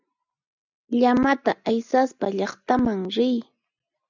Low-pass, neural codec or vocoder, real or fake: 7.2 kHz; none; real